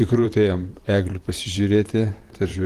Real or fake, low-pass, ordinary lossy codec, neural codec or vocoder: fake; 14.4 kHz; Opus, 24 kbps; vocoder, 44.1 kHz, 128 mel bands, Pupu-Vocoder